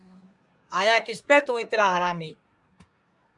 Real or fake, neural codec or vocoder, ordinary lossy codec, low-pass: fake; codec, 24 kHz, 1 kbps, SNAC; MP3, 96 kbps; 10.8 kHz